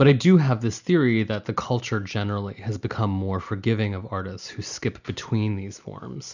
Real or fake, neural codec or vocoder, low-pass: real; none; 7.2 kHz